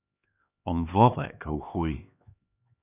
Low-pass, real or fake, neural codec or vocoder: 3.6 kHz; fake; codec, 16 kHz, 2 kbps, X-Codec, HuBERT features, trained on LibriSpeech